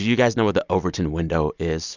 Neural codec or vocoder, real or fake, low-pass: none; real; 7.2 kHz